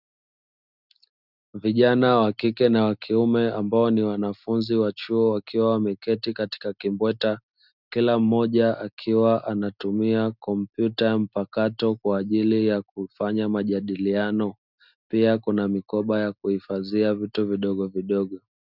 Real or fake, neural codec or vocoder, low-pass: real; none; 5.4 kHz